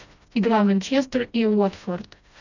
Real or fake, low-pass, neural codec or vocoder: fake; 7.2 kHz; codec, 16 kHz, 1 kbps, FreqCodec, smaller model